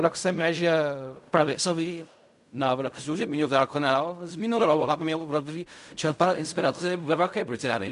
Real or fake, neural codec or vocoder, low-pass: fake; codec, 16 kHz in and 24 kHz out, 0.4 kbps, LongCat-Audio-Codec, fine tuned four codebook decoder; 10.8 kHz